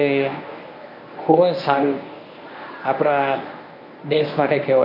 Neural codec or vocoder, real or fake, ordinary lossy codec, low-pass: codec, 24 kHz, 0.9 kbps, WavTokenizer, medium speech release version 1; fake; none; 5.4 kHz